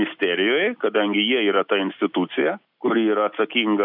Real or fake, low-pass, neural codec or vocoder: real; 5.4 kHz; none